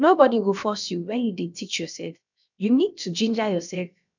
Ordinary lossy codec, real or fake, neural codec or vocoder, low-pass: none; fake; codec, 16 kHz, about 1 kbps, DyCAST, with the encoder's durations; 7.2 kHz